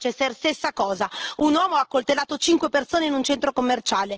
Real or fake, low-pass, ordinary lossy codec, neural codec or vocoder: real; 7.2 kHz; Opus, 16 kbps; none